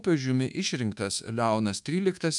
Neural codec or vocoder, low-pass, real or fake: codec, 24 kHz, 1.2 kbps, DualCodec; 10.8 kHz; fake